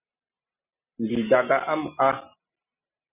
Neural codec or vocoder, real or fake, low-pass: none; real; 3.6 kHz